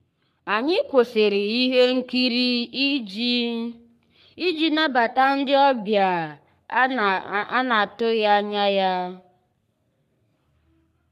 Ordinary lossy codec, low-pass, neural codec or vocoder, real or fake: none; 14.4 kHz; codec, 44.1 kHz, 3.4 kbps, Pupu-Codec; fake